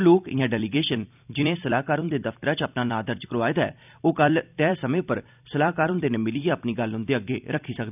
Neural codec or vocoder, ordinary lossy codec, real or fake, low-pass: vocoder, 44.1 kHz, 128 mel bands every 256 samples, BigVGAN v2; none; fake; 3.6 kHz